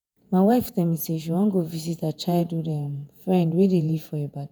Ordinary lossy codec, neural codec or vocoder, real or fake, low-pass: none; vocoder, 48 kHz, 128 mel bands, Vocos; fake; none